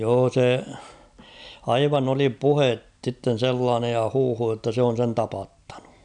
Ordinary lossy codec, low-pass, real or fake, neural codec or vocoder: none; 9.9 kHz; real; none